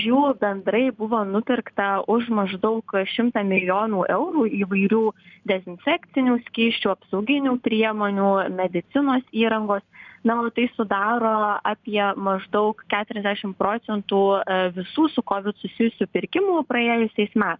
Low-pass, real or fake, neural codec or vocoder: 7.2 kHz; real; none